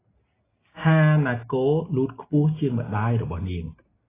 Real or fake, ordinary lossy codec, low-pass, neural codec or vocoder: real; AAC, 16 kbps; 3.6 kHz; none